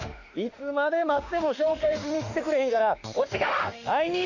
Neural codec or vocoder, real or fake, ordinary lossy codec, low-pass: autoencoder, 48 kHz, 32 numbers a frame, DAC-VAE, trained on Japanese speech; fake; none; 7.2 kHz